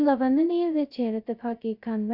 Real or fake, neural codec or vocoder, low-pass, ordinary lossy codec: fake; codec, 16 kHz, 0.2 kbps, FocalCodec; 5.4 kHz; none